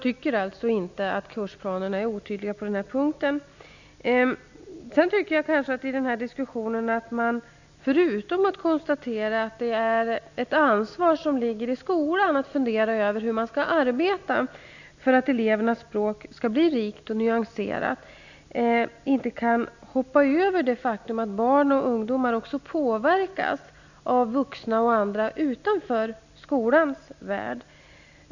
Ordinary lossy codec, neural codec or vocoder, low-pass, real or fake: none; none; 7.2 kHz; real